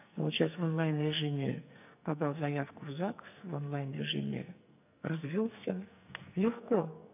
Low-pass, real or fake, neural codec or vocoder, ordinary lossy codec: 3.6 kHz; fake; codec, 44.1 kHz, 2.6 kbps, SNAC; none